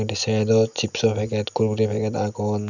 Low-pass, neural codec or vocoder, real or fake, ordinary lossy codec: 7.2 kHz; none; real; none